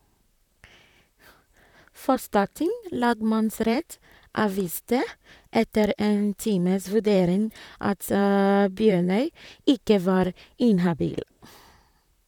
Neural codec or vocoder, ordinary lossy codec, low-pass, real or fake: vocoder, 44.1 kHz, 128 mel bands, Pupu-Vocoder; none; 19.8 kHz; fake